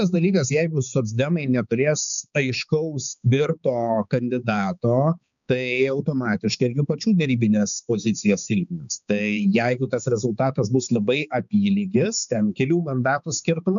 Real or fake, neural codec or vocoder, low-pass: fake; codec, 16 kHz, 4 kbps, X-Codec, HuBERT features, trained on balanced general audio; 7.2 kHz